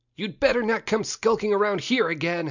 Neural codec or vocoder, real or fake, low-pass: none; real; 7.2 kHz